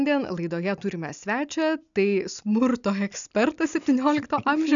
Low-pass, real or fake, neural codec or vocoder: 7.2 kHz; real; none